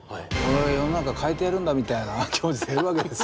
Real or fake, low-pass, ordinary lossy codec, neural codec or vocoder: real; none; none; none